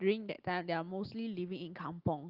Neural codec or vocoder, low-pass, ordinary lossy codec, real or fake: none; 5.4 kHz; Opus, 32 kbps; real